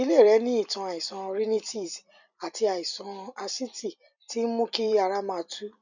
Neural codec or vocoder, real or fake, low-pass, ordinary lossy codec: vocoder, 44.1 kHz, 128 mel bands every 256 samples, BigVGAN v2; fake; 7.2 kHz; none